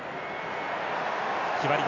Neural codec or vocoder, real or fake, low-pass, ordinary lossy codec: none; real; 7.2 kHz; none